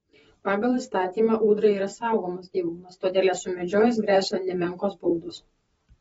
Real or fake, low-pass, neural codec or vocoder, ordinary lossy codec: fake; 19.8 kHz; vocoder, 44.1 kHz, 128 mel bands every 512 samples, BigVGAN v2; AAC, 24 kbps